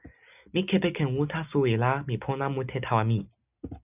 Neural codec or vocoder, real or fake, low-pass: none; real; 3.6 kHz